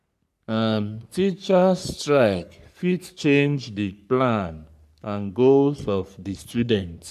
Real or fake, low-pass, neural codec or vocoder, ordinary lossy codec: fake; 14.4 kHz; codec, 44.1 kHz, 3.4 kbps, Pupu-Codec; none